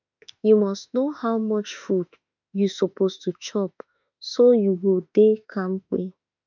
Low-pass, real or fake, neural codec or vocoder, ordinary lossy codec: 7.2 kHz; fake; autoencoder, 48 kHz, 32 numbers a frame, DAC-VAE, trained on Japanese speech; none